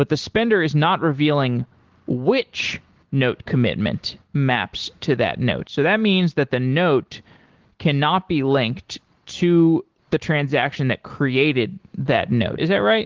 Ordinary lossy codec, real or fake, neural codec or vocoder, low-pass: Opus, 16 kbps; real; none; 7.2 kHz